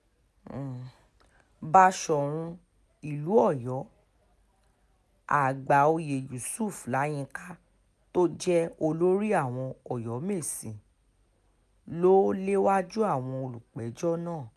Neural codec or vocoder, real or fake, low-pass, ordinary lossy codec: none; real; none; none